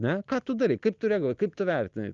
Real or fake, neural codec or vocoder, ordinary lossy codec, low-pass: real; none; Opus, 24 kbps; 7.2 kHz